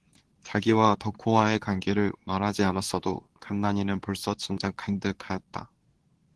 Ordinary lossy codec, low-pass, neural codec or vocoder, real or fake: Opus, 16 kbps; 10.8 kHz; codec, 24 kHz, 0.9 kbps, WavTokenizer, medium speech release version 2; fake